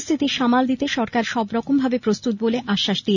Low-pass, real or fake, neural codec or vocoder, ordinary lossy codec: 7.2 kHz; real; none; none